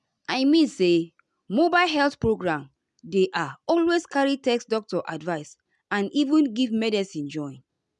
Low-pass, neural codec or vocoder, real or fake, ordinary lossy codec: 10.8 kHz; none; real; none